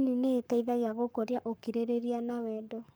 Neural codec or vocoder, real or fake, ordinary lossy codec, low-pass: codec, 44.1 kHz, 7.8 kbps, Pupu-Codec; fake; none; none